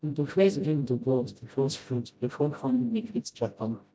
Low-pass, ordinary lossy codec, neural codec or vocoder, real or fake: none; none; codec, 16 kHz, 0.5 kbps, FreqCodec, smaller model; fake